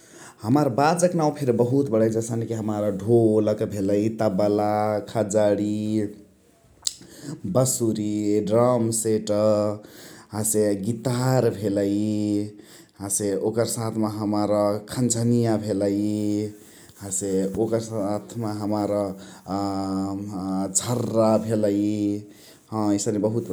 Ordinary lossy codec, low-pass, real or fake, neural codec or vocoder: none; none; real; none